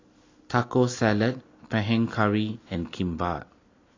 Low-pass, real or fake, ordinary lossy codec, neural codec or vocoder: 7.2 kHz; real; AAC, 32 kbps; none